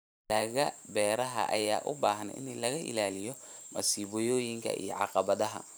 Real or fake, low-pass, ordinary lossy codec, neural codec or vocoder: fake; none; none; vocoder, 44.1 kHz, 128 mel bands every 512 samples, BigVGAN v2